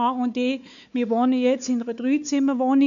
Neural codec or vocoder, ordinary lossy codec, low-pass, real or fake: codec, 16 kHz, 4 kbps, X-Codec, WavLM features, trained on Multilingual LibriSpeech; none; 7.2 kHz; fake